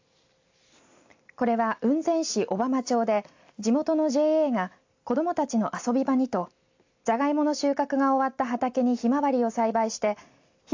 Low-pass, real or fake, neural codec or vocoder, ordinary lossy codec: 7.2 kHz; real; none; AAC, 48 kbps